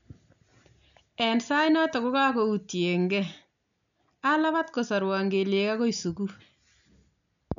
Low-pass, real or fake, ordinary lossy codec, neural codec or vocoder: 7.2 kHz; real; none; none